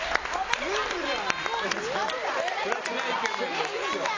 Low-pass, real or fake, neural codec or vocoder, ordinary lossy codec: 7.2 kHz; real; none; AAC, 32 kbps